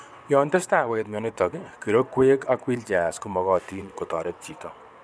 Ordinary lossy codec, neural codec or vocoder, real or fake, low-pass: none; vocoder, 22.05 kHz, 80 mel bands, WaveNeXt; fake; none